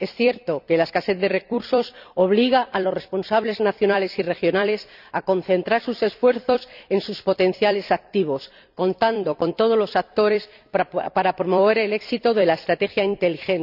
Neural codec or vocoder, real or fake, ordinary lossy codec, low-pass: vocoder, 44.1 kHz, 128 mel bands every 256 samples, BigVGAN v2; fake; none; 5.4 kHz